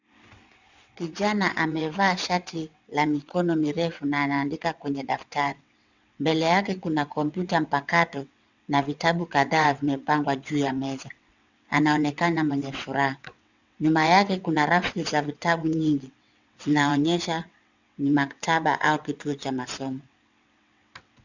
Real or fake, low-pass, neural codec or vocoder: fake; 7.2 kHz; vocoder, 22.05 kHz, 80 mel bands, WaveNeXt